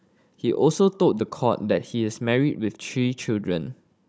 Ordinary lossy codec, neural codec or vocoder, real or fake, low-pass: none; codec, 16 kHz, 16 kbps, FunCodec, trained on Chinese and English, 50 frames a second; fake; none